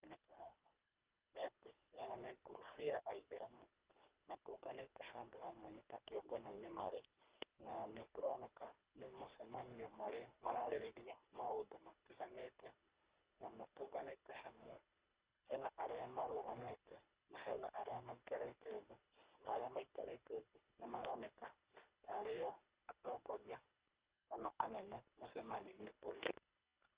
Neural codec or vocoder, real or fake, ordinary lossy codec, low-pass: codec, 24 kHz, 1.5 kbps, HILCodec; fake; Opus, 24 kbps; 3.6 kHz